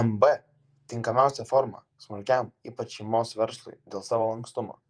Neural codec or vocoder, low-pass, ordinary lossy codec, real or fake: vocoder, 48 kHz, 128 mel bands, Vocos; 9.9 kHz; Opus, 32 kbps; fake